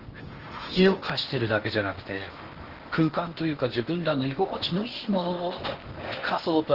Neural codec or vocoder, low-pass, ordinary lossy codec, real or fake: codec, 16 kHz in and 24 kHz out, 0.8 kbps, FocalCodec, streaming, 65536 codes; 5.4 kHz; Opus, 16 kbps; fake